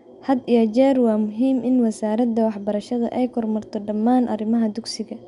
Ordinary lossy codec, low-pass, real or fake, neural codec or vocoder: none; 10.8 kHz; real; none